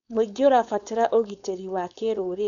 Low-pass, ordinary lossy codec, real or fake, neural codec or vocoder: 7.2 kHz; none; fake; codec, 16 kHz, 4.8 kbps, FACodec